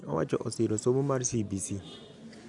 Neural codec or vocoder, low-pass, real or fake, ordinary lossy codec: none; 10.8 kHz; real; none